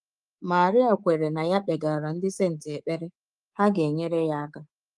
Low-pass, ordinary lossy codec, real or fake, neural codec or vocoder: 10.8 kHz; Opus, 24 kbps; fake; codec, 24 kHz, 3.1 kbps, DualCodec